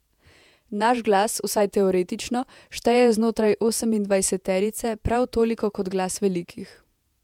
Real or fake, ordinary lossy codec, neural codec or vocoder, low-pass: fake; MP3, 96 kbps; vocoder, 48 kHz, 128 mel bands, Vocos; 19.8 kHz